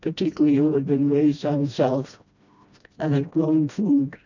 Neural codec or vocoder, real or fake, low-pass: codec, 16 kHz, 1 kbps, FreqCodec, smaller model; fake; 7.2 kHz